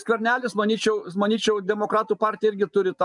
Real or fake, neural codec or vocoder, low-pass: real; none; 10.8 kHz